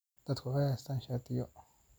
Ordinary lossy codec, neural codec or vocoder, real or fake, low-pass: none; none; real; none